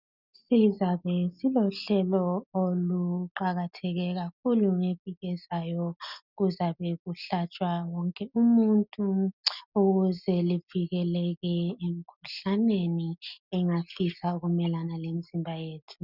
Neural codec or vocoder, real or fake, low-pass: none; real; 5.4 kHz